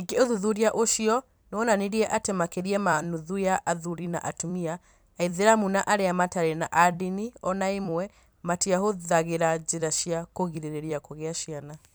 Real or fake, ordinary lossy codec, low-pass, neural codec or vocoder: fake; none; none; vocoder, 44.1 kHz, 128 mel bands every 256 samples, BigVGAN v2